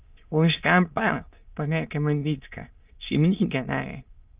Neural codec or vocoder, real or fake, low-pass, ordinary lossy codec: autoencoder, 22.05 kHz, a latent of 192 numbers a frame, VITS, trained on many speakers; fake; 3.6 kHz; Opus, 32 kbps